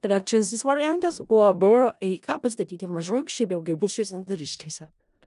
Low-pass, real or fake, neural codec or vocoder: 10.8 kHz; fake; codec, 16 kHz in and 24 kHz out, 0.4 kbps, LongCat-Audio-Codec, four codebook decoder